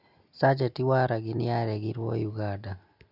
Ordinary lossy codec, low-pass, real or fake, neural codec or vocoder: none; 5.4 kHz; real; none